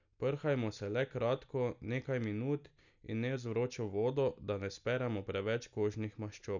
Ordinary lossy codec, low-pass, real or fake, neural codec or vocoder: none; 7.2 kHz; real; none